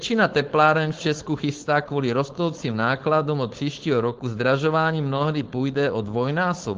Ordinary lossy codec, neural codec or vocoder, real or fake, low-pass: Opus, 16 kbps; codec, 16 kHz, 4.8 kbps, FACodec; fake; 7.2 kHz